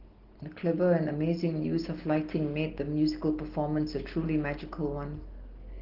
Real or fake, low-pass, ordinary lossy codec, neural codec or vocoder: real; 5.4 kHz; Opus, 16 kbps; none